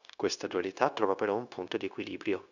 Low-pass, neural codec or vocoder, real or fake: 7.2 kHz; codec, 16 kHz, 0.9 kbps, LongCat-Audio-Codec; fake